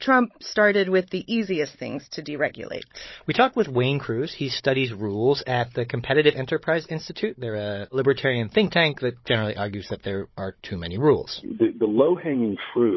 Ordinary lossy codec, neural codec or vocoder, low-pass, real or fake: MP3, 24 kbps; codec, 16 kHz, 16 kbps, FunCodec, trained on Chinese and English, 50 frames a second; 7.2 kHz; fake